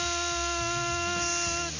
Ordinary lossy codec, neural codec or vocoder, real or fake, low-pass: none; none; real; 7.2 kHz